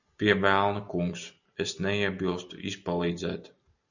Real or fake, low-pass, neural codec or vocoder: real; 7.2 kHz; none